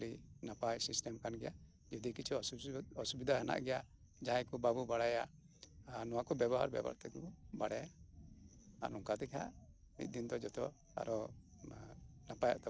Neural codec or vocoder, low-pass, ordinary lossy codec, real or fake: none; none; none; real